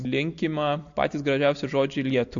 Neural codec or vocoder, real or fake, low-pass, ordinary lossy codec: none; real; 7.2 kHz; MP3, 48 kbps